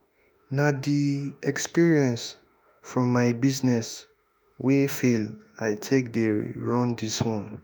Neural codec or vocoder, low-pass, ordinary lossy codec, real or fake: autoencoder, 48 kHz, 32 numbers a frame, DAC-VAE, trained on Japanese speech; none; none; fake